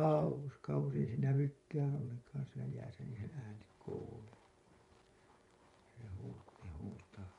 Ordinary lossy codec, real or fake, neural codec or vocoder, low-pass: MP3, 64 kbps; fake; vocoder, 24 kHz, 100 mel bands, Vocos; 10.8 kHz